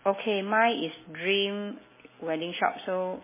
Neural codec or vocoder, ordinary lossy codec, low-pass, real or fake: none; MP3, 16 kbps; 3.6 kHz; real